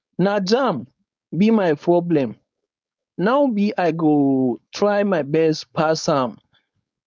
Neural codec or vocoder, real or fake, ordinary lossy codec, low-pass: codec, 16 kHz, 4.8 kbps, FACodec; fake; none; none